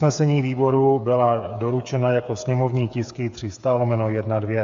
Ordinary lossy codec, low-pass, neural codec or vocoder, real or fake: AAC, 64 kbps; 7.2 kHz; codec, 16 kHz, 8 kbps, FreqCodec, smaller model; fake